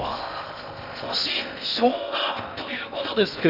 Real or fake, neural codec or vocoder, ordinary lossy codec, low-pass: fake; codec, 16 kHz in and 24 kHz out, 0.6 kbps, FocalCodec, streaming, 4096 codes; none; 5.4 kHz